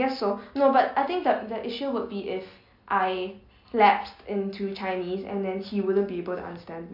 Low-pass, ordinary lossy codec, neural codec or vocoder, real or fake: 5.4 kHz; MP3, 48 kbps; none; real